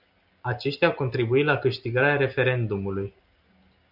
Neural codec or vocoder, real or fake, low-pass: none; real; 5.4 kHz